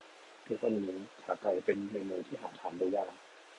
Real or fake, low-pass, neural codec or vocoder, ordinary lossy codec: real; 10.8 kHz; none; AAC, 48 kbps